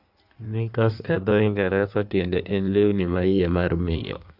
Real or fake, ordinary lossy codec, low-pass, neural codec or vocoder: fake; AAC, 48 kbps; 5.4 kHz; codec, 16 kHz in and 24 kHz out, 1.1 kbps, FireRedTTS-2 codec